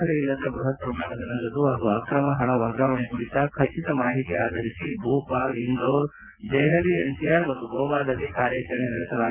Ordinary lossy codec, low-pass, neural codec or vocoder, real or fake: none; 3.6 kHz; vocoder, 22.05 kHz, 80 mel bands, WaveNeXt; fake